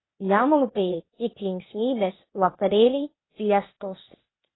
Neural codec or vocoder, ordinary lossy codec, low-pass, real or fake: codec, 16 kHz, 0.8 kbps, ZipCodec; AAC, 16 kbps; 7.2 kHz; fake